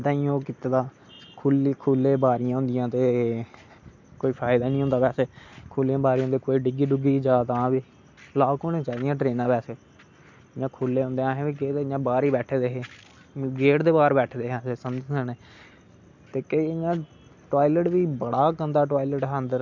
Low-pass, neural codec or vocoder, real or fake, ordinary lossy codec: 7.2 kHz; none; real; MP3, 64 kbps